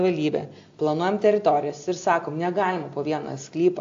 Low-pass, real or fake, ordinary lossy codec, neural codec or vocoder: 7.2 kHz; real; AAC, 48 kbps; none